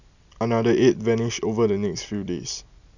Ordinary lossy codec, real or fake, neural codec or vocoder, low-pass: none; real; none; 7.2 kHz